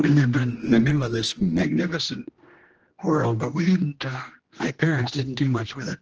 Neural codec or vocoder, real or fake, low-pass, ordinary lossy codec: codec, 16 kHz, 1 kbps, X-Codec, HuBERT features, trained on general audio; fake; 7.2 kHz; Opus, 16 kbps